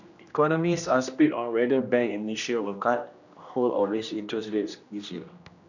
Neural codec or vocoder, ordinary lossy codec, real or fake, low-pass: codec, 16 kHz, 1 kbps, X-Codec, HuBERT features, trained on general audio; none; fake; 7.2 kHz